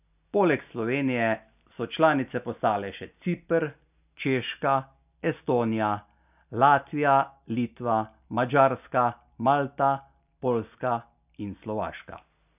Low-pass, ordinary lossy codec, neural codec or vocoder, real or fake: 3.6 kHz; none; none; real